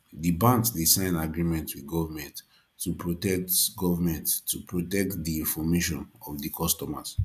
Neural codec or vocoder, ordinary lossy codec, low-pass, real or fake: none; none; 14.4 kHz; real